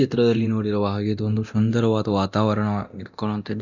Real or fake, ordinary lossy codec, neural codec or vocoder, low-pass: fake; Opus, 64 kbps; codec, 24 kHz, 0.9 kbps, DualCodec; 7.2 kHz